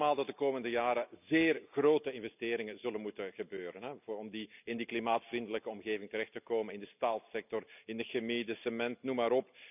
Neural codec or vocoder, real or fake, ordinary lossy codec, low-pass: none; real; none; 3.6 kHz